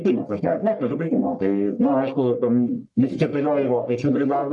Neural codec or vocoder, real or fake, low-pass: codec, 44.1 kHz, 1.7 kbps, Pupu-Codec; fake; 10.8 kHz